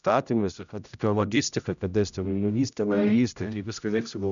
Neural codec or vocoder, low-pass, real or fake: codec, 16 kHz, 0.5 kbps, X-Codec, HuBERT features, trained on general audio; 7.2 kHz; fake